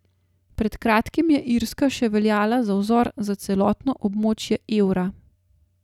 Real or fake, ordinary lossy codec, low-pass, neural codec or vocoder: real; none; 19.8 kHz; none